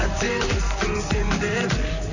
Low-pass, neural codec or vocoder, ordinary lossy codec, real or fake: 7.2 kHz; vocoder, 44.1 kHz, 128 mel bands, Pupu-Vocoder; none; fake